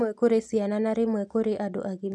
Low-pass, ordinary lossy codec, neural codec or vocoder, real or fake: none; none; none; real